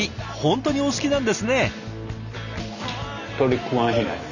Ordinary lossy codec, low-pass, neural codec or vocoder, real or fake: none; 7.2 kHz; none; real